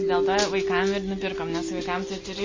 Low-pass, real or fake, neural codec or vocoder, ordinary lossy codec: 7.2 kHz; real; none; MP3, 32 kbps